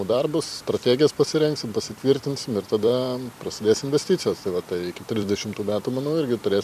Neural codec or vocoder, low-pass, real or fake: vocoder, 44.1 kHz, 128 mel bands every 256 samples, BigVGAN v2; 14.4 kHz; fake